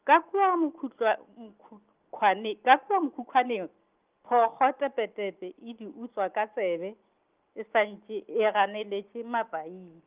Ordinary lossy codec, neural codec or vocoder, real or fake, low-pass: Opus, 24 kbps; none; real; 3.6 kHz